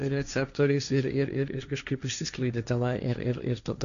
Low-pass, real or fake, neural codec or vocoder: 7.2 kHz; fake; codec, 16 kHz, 1.1 kbps, Voila-Tokenizer